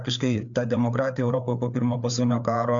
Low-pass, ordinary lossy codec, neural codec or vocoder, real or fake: 7.2 kHz; MP3, 64 kbps; codec, 16 kHz, 4 kbps, FreqCodec, larger model; fake